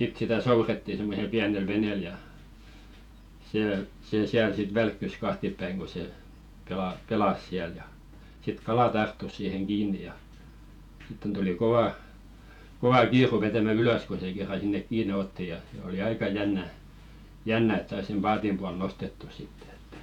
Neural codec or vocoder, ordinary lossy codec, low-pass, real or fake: vocoder, 48 kHz, 128 mel bands, Vocos; none; 19.8 kHz; fake